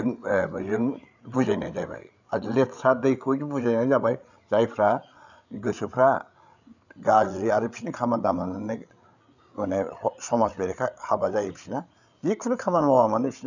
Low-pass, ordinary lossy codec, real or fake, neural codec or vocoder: 7.2 kHz; none; fake; codec, 16 kHz, 8 kbps, FreqCodec, larger model